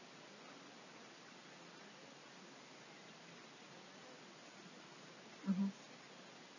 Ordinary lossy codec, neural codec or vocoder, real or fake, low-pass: none; none; real; 7.2 kHz